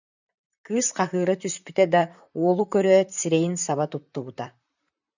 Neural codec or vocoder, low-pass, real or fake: vocoder, 44.1 kHz, 128 mel bands, Pupu-Vocoder; 7.2 kHz; fake